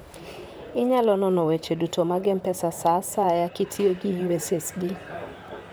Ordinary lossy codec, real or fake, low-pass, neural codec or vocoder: none; fake; none; vocoder, 44.1 kHz, 128 mel bands, Pupu-Vocoder